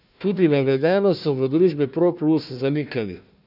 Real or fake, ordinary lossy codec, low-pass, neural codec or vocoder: fake; none; 5.4 kHz; codec, 16 kHz, 1 kbps, FunCodec, trained on Chinese and English, 50 frames a second